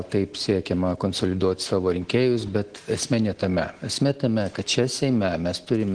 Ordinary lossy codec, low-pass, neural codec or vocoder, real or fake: Opus, 16 kbps; 9.9 kHz; none; real